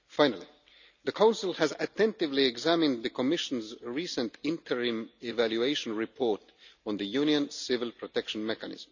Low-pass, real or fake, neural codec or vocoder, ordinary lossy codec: 7.2 kHz; real; none; none